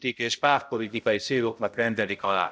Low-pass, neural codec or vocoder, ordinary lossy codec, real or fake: none; codec, 16 kHz, 0.5 kbps, X-Codec, HuBERT features, trained on balanced general audio; none; fake